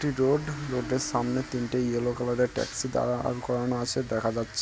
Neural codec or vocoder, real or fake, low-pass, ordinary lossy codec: none; real; none; none